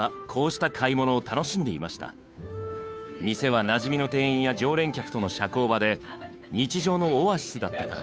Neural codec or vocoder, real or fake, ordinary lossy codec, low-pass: codec, 16 kHz, 2 kbps, FunCodec, trained on Chinese and English, 25 frames a second; fake; none; none